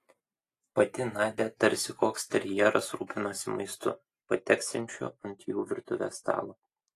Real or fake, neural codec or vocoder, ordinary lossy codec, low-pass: real; none; AAC, 48 kbps; 14.4 kHz